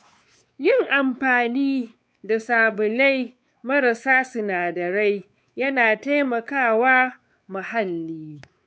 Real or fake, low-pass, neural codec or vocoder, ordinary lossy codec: fake; none; codec, 16 kHz, 4 kbps, X-Codec, WavLM features, trained on Multilingual LibriSpeech; none